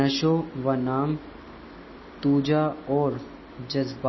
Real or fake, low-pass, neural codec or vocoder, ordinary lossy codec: real; 7.2 kHz; none; MP3, 24 kbps